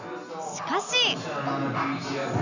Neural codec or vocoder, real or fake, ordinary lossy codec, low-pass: none; real; none; 7.2 kHz